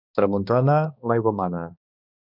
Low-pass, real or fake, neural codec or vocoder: 5.4 kHz; fake; codec, 16 kHz, 2 kbps, X-Codec, HuBERT features, trained on balanced general audio